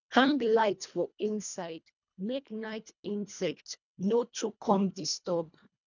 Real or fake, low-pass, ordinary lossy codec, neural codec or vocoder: fake; 7.2 kHz; none; codec, 24 kHz, 1.5 kbps, HILCodec